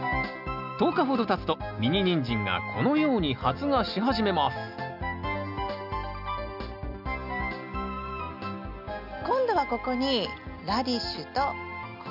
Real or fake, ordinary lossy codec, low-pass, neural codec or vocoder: real; none; 5.4 kHz; none